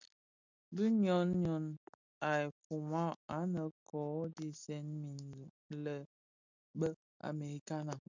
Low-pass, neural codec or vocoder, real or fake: 7.2 kHz; none; real